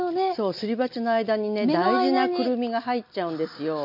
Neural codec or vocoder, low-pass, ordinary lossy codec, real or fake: none; 5.4 kHz; MP3, 48 kbps; real